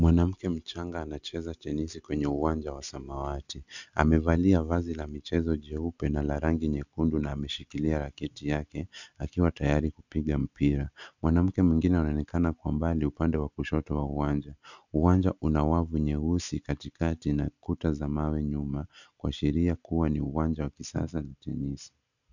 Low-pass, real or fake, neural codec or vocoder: 7.2 kHz; real; none